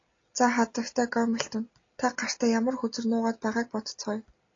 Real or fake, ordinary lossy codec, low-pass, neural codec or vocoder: real; AAC, 32 kbps; 7.2 kHz; none